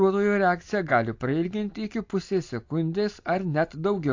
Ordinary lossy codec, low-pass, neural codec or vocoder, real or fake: AAC, 48 kbps; 7.2 kHz; none; real